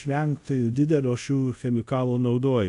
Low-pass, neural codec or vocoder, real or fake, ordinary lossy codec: 10.8 kHz; codec, 24 kHz, 0.5 kbps, DualCodec; fake; AAC, 96 kbps